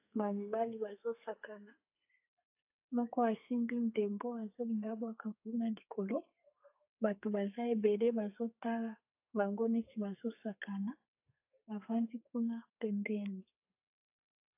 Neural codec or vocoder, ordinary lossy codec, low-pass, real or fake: codec, 32 kHz, 1.9 kbps, SNAC; AAC, 32 kbps; 3.6 kHz; fake